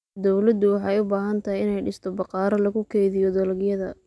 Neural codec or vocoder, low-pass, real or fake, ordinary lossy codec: none; none; real; none